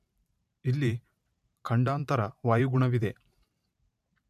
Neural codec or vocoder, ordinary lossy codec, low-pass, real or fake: vocoder, 44.1 kHz, 128 mel bands every 512 samples, BigVGAN v2; MP3, 96 kbps; 14.4 kHz; fake